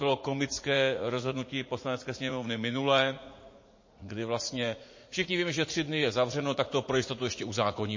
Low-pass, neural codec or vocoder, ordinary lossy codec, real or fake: 7.2 kHz; vocoder, 44.1 kHz, 80 mel bands, Vocos; MP3, 32 kbps; fake